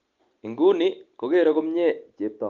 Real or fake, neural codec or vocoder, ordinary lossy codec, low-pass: real; none; Opus, 32 kbps; 7.2 kHz